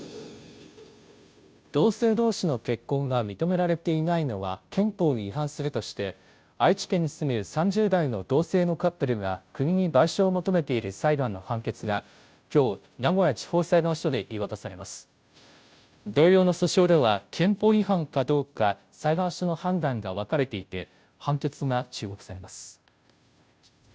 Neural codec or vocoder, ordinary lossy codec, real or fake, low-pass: codec, 16 kHz, 0.5 kbps, FunCodec, trained on Chinese and English, 25 frames a second; none; fake; none